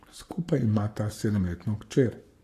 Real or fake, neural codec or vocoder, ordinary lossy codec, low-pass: fake; codec, 44.1 kHz, 7.8 kbps, Pupu-Codec; AAC, 64 kbps; 14.4 kHz